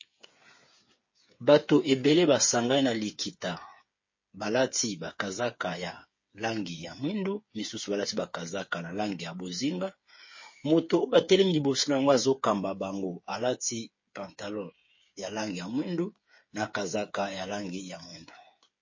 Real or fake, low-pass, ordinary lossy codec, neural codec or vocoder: fake; 7.2 kHz; MP3, 32 kbps; codec, 16 kHz, 8 kbps, FreqCodec, smaller model